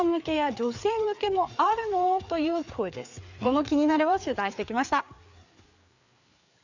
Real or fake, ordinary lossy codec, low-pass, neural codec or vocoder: fake; none; 7.2 kHz; codec, 16 kHz, 4 kbps, FreqCodec, larger model